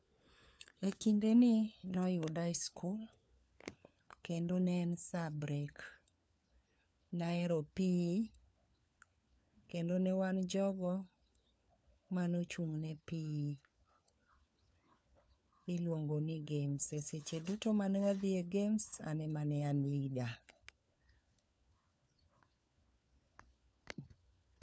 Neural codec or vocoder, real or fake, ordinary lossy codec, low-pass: codec, 16 kHz, 4 kbps, FunCodec, trained on LibriTTS, 50 frames a second; fake; none; none